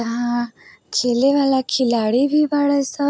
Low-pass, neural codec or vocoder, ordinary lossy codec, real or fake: none; none; none; real